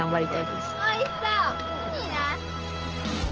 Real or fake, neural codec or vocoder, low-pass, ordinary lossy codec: real; none; 7.2 kHz; Opus, 16 kbps